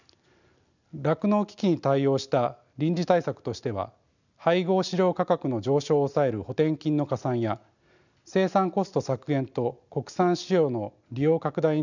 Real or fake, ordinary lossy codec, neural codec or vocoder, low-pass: real; none; none; 7.2 kHz